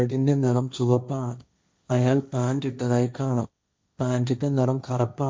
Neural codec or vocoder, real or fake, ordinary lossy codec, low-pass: codec, 16 kHz, 1.1 kbps, Voila-Tokenizer; fake; none; none